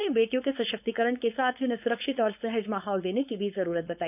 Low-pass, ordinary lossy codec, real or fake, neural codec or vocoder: 3.6 kHz; none; fake; codec, 16 kHz, 4.8 kbps, FACodec